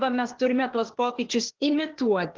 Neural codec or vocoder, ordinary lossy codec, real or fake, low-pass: codec, 16 kHz, 0.8 kbps, ZipCodec; Opus, 16 kbps; fake; 7.2 kHz